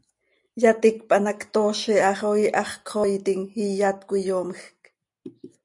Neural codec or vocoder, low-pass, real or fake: none; 10.8 kHz; real